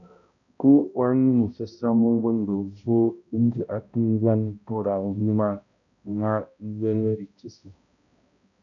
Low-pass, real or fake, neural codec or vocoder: 7.2 kHz; fake; codec, 16 kHz, 0.5 kbps, X-Codec, HuBERT features, trained on balanced general audio